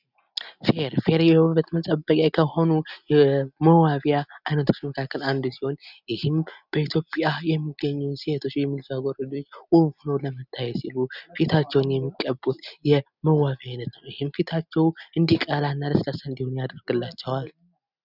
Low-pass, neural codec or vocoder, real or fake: 5.4 kHz; none; real